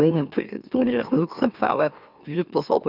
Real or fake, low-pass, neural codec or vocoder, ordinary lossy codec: fake; 5.4 kHz; autoencoder, 44.1 kHz, a latent of 192 numbers a frame, MeloTTS; none